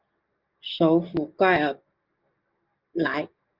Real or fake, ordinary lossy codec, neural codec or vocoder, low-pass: real; Opus, 24 kbps; none; 5.4 kHz